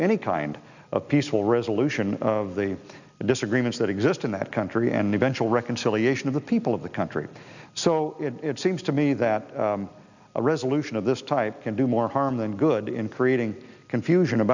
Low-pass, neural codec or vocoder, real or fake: 7.2 kHz; none; real